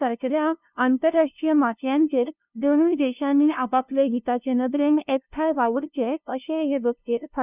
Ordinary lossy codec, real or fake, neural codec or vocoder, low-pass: none; fake; codec, 16 kHz, 0.5 kbps, FunCodec, trained on LibriTTS, 25 frames a second; 3.6 kHz